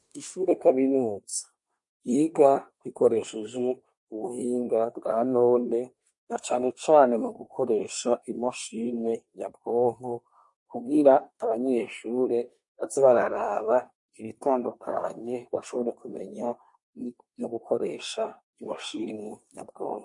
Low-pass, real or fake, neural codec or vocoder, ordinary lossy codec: 10.8 kHz; fake; codec, 24 kHz, 1 kbps, SNAC; MP3, 48 kbps